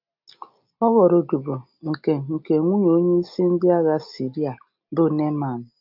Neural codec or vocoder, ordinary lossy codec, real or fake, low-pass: none; none; real; 5.4 kHz